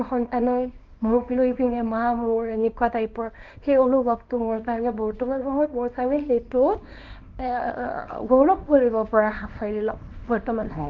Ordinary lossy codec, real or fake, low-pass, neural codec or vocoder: Opus, 24 kbps; fake; 7.2 kHz; codec, 24 kHz, 0.9 kbps, WavTokenizer, small release